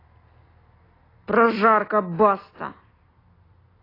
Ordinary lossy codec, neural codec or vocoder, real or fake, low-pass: AAC, 24 kbps; none; real; 5.4 kHz